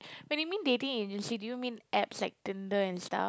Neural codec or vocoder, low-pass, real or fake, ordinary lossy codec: none; none; real; none